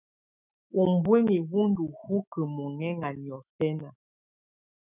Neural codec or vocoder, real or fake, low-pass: autoencoder, 48 kHz, 128 numbers a frame, DAC-VAE, trained on Japanese speech; fake; 3.6 kHz